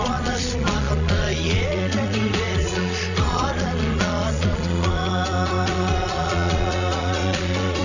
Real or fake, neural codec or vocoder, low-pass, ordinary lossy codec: fake; vocoder, 22.05 kHz, 80 mel bands, Vocos; 7.2 kHz; none